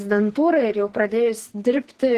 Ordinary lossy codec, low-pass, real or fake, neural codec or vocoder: Opus, 16 kbps; 14.4 kHz; fake; codec, 44.1 kHz, 2.6 kbps, SNAC